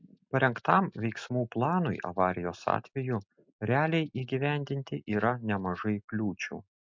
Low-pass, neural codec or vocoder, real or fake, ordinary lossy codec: 7.2 kHz; none; real; MP3, 64 kbps